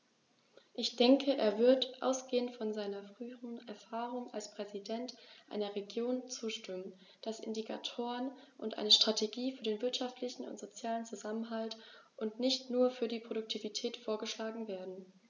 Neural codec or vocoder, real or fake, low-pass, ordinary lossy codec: none; real; 7.2 kHz; none